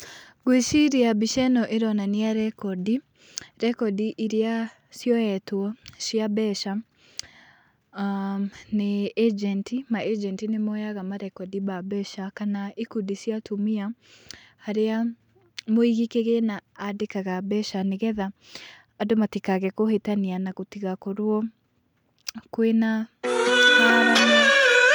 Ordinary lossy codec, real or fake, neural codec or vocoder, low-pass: none; real; none; 19.8 kHz